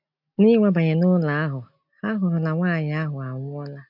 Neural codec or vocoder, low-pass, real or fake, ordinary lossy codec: none; 5.4 kHz; real; MP3, 48 kbps